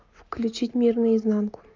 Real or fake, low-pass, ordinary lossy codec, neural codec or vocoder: real; 7.2 kHz; Opus, 24 kbps; none